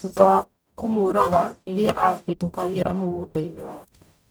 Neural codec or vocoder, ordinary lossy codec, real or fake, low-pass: codec, 44.1 kHz, 0.9 kbps, DAC; none; fake; none